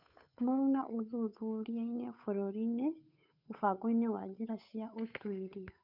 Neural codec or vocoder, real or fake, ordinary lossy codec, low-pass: codec, 16 kHz, 8 kbps, FunCodec, trained on Chinese and English, 25 frames a second; fake; none; 5.4 kHz